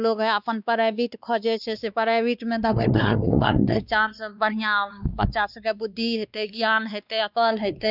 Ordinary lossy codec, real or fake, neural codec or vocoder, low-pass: none; fake; codec, 16 kHz, 2 kbps, X-Codec, WavLM features, trained on Multilingual LibriSpeech; 5.4 kHz